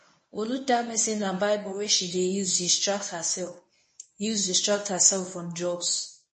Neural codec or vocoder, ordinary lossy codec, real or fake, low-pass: codec, 24 kHz, 0.9 kbps, WavTokenizer, medium speech release version 1; MP3, 32 kbps; fake; 9.9 kHz